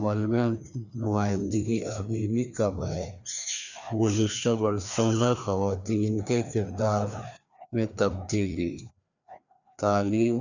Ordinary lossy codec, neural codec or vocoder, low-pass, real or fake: none; codec, 16 kHz, 2 kbps, FreqCodec, larger model; 7.2 kHz; fake